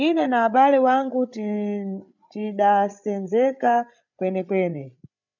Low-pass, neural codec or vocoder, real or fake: 7.2 kHz; codec, 16 kHz, 8 kbps, FreqCodec, larger model; fake